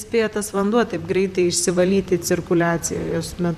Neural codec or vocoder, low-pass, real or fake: vocoder, 44.1 kHz, 128 mel bands, Pupu-Vocoder; 14.4 kHz; fake